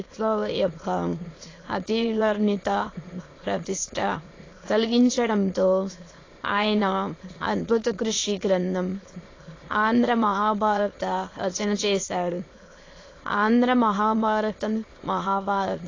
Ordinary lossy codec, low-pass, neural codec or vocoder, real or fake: AAC, 32 kbps; 7.2 kHz; autoencoder, 22.05 kHz, a latent of 192 numbers a frame, VITS, trained on many speakers; fake